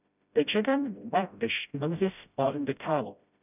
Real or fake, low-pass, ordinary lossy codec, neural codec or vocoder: fake; 3.6 kHz; none; codec, 16 kHz, 0.5 kbps, FreqCodec, smaller model